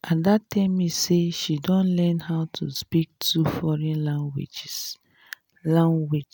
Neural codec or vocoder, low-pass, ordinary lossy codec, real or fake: none; none; none; real